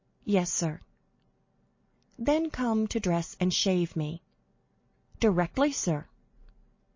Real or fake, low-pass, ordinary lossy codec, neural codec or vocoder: real; 7.2 kHz; MP3, 32 kbps; none